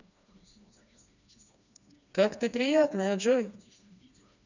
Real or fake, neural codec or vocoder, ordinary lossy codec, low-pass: fake; codec, 16 kHz, 2 kbps, FreqCodec, smaller model; none; 7.2 kHz